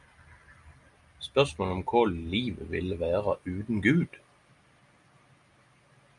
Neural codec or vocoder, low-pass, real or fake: none; 10.8 kHz; real